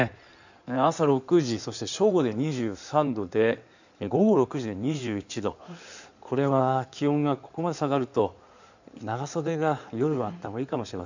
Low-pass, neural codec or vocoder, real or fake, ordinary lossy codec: 7.2 kHz; codec, 16 kHz in and 24 kHz out, 2.2 kbps, FireRedTTS-2 codec; fake; none